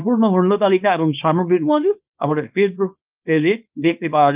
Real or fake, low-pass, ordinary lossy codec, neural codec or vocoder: fake; 3.6 kHz; Opus, 24 kbps; codec, 24 kHz, 0.9 kbps, WavTokenizer, small release